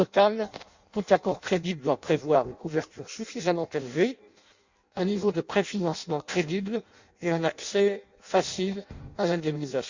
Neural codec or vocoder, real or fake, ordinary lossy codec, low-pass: codec, 16 kHz in and 24 kHz out, 0.6 kbps, FireRedTTS-2 codec; fake; Opus, 64 kbps; 7.2 kHz